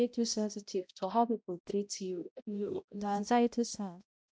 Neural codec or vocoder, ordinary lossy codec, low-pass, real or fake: codec, 16 kHz, 0.5 kbps, X-Codec, HuBERT features, trained on balanced general audio; none; none; fake